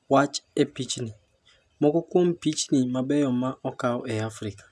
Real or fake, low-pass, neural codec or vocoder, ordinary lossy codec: real; none; none; none